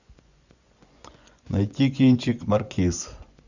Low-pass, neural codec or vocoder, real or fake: 7.2 kHz; vocoder, 24 kHz, 100 mel bands, Vocos; fake